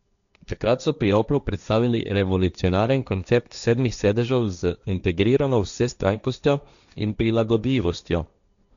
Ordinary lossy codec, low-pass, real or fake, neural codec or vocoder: none; 7.2 kHz; fake; codec, 16 kHz, 1.1 kbps, Voila-Tokenizer